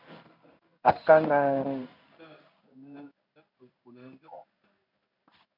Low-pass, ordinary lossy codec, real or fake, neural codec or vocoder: 5.4 kHz; MP3, 48 kbps; fake; codec, 16 kHz in and 24 kHz out, 1 kbps, XY-Tokenizer